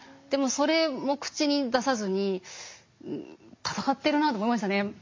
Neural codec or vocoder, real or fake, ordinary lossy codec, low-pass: none; real; MP3, 48 kbps; 7.2 kHz